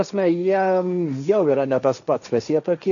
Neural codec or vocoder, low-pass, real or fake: codec, 16 kHz, 1.1 kbps, Voila-Tokenizer; 7.2 kHz; fake